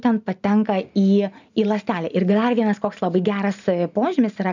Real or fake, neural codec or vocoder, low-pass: real; none; 7.2 kHz